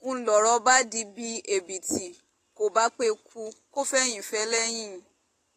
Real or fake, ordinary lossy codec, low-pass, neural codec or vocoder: real; AAC, 48 kbps; 14.4 kHz; none